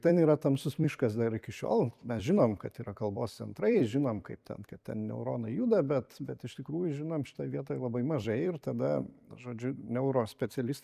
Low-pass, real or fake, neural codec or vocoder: 14.4 kHz; fake; vocoder, 44.1 kHz, 128 mel bands every 256 samples, BigVGAN v2